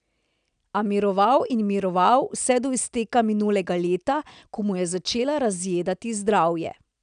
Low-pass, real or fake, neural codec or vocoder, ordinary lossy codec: 9.9 kHz; real; none; none